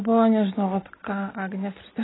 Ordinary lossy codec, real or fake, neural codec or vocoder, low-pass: AAC, 16 kbps; real; none; 7.2 kHz